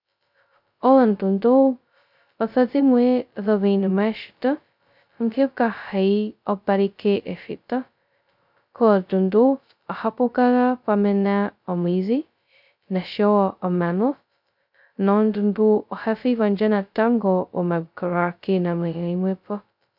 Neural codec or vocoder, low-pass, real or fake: codec, 16 kHz, 0.2 kbps, FocalCodec; 5.4 kHz; fake